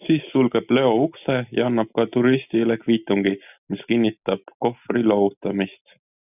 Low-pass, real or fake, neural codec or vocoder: 3.6 kHz; real; none